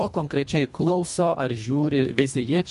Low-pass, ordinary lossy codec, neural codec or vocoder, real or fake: 10.8 kHz; MP3, 64 kbps; codec, 24 kHz, 1.5 kbps, HILCodec; fake